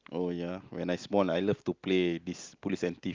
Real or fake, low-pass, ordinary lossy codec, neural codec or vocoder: real; 7.2 kHz; Opus, 32 kbps; none